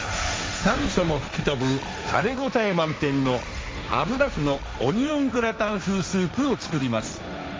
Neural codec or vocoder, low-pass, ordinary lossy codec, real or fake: codec, 16 kHz, 1.1 kbps, Voila-Tokenizer; none; none; fake